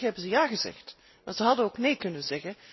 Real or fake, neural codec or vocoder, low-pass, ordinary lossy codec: real; none; 7.2 kHz; MP3, 24 kbps